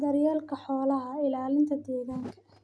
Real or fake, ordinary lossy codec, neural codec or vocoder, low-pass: real; none; none; none